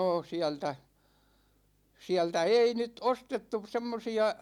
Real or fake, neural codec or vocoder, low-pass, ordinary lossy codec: real; none; 19.8 kHz; none